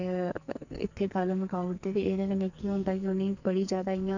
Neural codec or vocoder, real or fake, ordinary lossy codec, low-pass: codec, 44.1 kHz, 2.6 kbps, SNAC; fake; none; 7.2 kHz